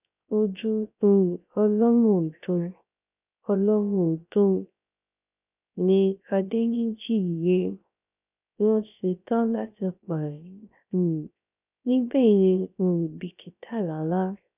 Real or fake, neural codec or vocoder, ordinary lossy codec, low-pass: fake; codec, 16 kHz, 0.3 kbps, FocalCodec; none; 3.6 kHz